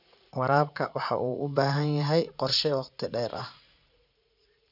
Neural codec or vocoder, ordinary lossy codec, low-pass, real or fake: vocoder, 44.1 kHz, 80 mel bands, Vocos; none; 5.4 kHz; fake